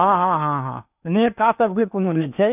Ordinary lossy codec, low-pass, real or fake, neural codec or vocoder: none; 3.6 kHz; fake; codec, 16 kHz in and 24 kHz out, 0.8 kbps, FocalCodec, streaming, 65536 codes